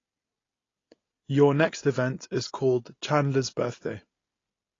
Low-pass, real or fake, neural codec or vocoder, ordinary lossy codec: 7.2 kHz; real; none; AAC, 32 kbps